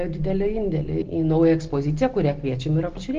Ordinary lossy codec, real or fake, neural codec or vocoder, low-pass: Opus, 16 kbps; real; none; 7.2 kHz